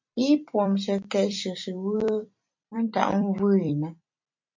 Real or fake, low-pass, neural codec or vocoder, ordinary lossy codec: fake; 7.2 kHz; vocoder, 44.1 kHz, 128 mel bands every 256 samples, BigVGAN v2; MP3, 48 kbps